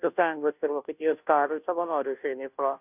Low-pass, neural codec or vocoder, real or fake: 3.6 kHz; codec, 16 kHz, 0.5 kbps, FunCodec, trained on Chinese and English, 25 frames a second; fake